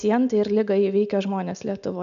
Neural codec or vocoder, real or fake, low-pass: none; real; 7.2 kHz